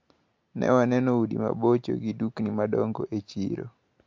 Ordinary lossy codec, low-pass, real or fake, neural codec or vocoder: MP3, 48 kbps; 7.2 kHz; real; none